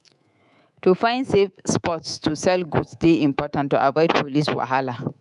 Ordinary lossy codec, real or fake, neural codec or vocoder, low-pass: none; fake; codec, 24 kHz, 3.1 kbps, DualCodec; 10.8 kHz